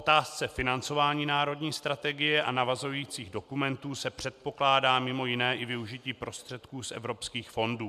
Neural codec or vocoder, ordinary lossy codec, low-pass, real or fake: none; MP3, 96 kbps; 14.4 kHz; real